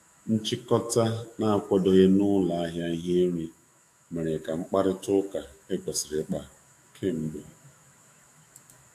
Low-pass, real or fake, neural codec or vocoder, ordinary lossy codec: 14.4 kHz; fake; autoencoder, 48 kHz, 128 numbers a frame, DAC-VAE, trained on Japanese speech; AAC, 64 kbps